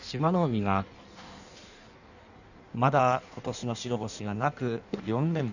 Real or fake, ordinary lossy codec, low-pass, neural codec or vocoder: fake; none; 7.2 kHz; codec, 16 kHz in and 24 kHz out, 1.1 kbps, FireRedTTS-2 codec